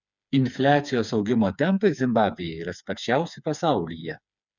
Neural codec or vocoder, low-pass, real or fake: codec, 16 kHz, 4 kbps, FreqCodec, smaller model; 7.2 kHz; fake